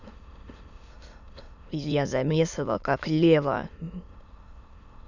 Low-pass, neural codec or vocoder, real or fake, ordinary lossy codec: 7.2 kHz; autoencoder, 22.05 kHz, a latent of 192 numbers a frame, VITS, trained on many speakers; fake; none